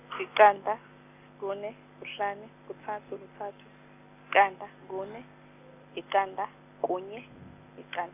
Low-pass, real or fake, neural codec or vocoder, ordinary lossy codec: 3.6 kHz; real; none; AAC, 32 kbps